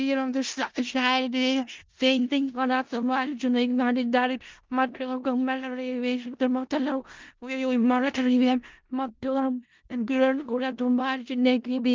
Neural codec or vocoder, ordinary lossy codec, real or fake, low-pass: codec, 16 kHz in and 24 kHz out, 0.4 kbps, LongCat-Audio-Codec, four codebook decoder; Opus, 32 kbps; fake; 7.2 kHz